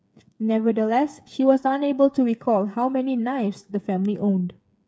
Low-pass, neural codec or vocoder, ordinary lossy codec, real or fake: none; codec, 16 kHz, 8 kbps, FreqCodec, smaller model; none; fake